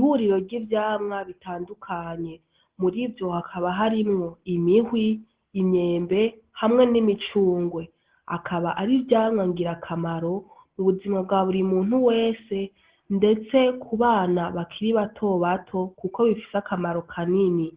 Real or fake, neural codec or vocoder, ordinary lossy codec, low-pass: real; none; Opus, 16 kbps; 3.6 kHz